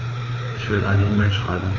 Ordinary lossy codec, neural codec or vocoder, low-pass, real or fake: none; codec, 16 kHz, 4 kbps, FreqCodec, larger model; 7.2 kHz; fake